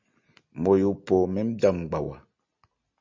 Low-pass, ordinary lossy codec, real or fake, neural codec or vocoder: 7.2 kHz; MP3, 64 kbps; real; none